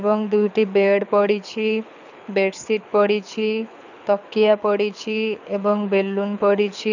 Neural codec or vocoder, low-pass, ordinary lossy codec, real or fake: codec, 24 kHz, 6 kbps, HILCodec; 7.2 kHz; none; fake